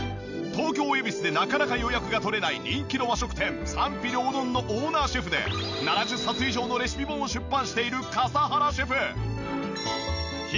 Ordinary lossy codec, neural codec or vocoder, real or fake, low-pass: none; none; real; 7.2 kHz